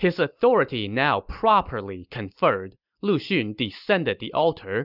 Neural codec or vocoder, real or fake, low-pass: none; real; 5.4 kHz